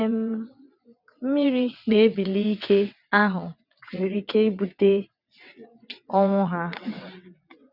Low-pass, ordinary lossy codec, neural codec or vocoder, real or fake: 5.4 kHz; none; vocoder, 22.05 kHz, 80 mel bands, WaveNeXt; fake